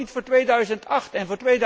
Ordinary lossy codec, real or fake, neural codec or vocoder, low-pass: none; real; none; none